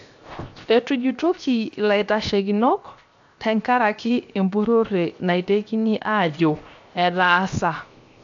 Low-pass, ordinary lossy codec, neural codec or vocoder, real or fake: 7.2 kHz; AAC, 64 kbps; codec, 16 kHz, 0.7 kbps, FocalCodec; fake